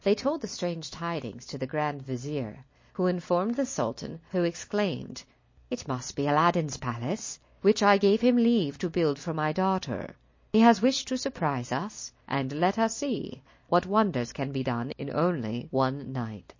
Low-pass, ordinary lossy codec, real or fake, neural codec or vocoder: 7.2 kHz; MP3, 32 kbps; real; none